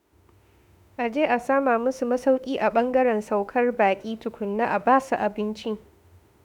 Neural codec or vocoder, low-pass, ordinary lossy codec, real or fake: autoencoder, 48 kHz, 32 numbers a frame, DAC-VAE, trained on Japanese speech; 19.8 kHz; none; fake